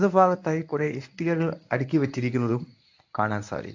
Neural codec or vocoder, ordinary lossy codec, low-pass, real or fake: codec, 24 kHz, 0.9 kbps, WavTokenizer, medium speech release version 1; AAC, 48 kbps; 7.2 kHz; fake